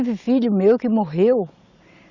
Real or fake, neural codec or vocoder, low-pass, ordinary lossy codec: real; none; 7.2 kHz; Opus, 64 kbps